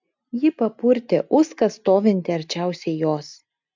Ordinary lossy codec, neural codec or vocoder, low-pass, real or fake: MP3, 64 kbps; none; 7.2 kHz; real